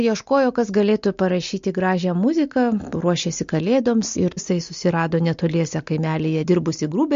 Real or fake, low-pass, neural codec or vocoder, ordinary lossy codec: real; 7.2 kHz; none; MP3, 48 kbps